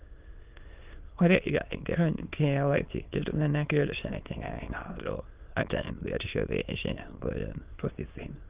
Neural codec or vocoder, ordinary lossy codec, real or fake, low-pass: autoencoder, 22.05 kHz, a latent of 192 numbers a frame, VITS, trained on many speakers; Opus, 32 kbps; fake; 3.6 kHz